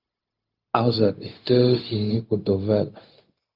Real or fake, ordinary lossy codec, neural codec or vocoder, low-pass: fake; Opus, 24 kbps; codec, 16 kHz, 0.4 kbps, LongCat-Audio-Codec; 5.4 kHz